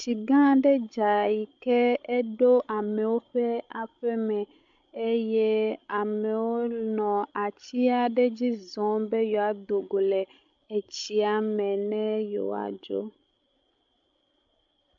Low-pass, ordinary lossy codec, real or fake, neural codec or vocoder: 7.2 kHz; MP3, 64 kbps; fake; codec, 16 kHz, 16 kbps, FreqCodec, larger model